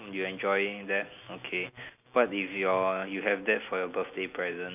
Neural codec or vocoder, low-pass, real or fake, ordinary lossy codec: none; 3.6 kHz; real; none